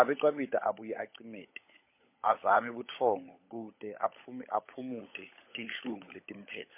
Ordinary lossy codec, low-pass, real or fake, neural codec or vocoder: MP3, 24 kbps; 3.6 kHz; fake; codec, 16 kHz, 16 kbps, FunCodec, trained on LibriTTS, 50 frames a second